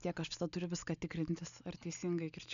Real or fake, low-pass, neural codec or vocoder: real; 7.2 kHz; none